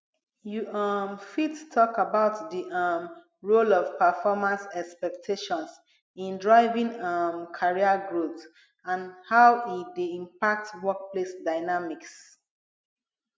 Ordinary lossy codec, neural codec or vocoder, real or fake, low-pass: none; none; real; none